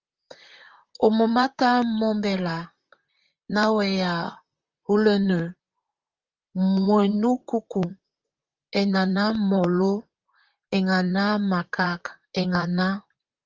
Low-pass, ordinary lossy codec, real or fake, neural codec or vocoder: 7.2 kHz; Opus, 32 kbps; fake; vocoder, 44.1 kHz, 128 mel bands, Pupu-Vocoder